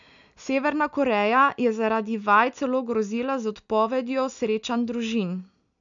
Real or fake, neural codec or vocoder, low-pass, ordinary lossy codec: real; none; 7.2 kHz; none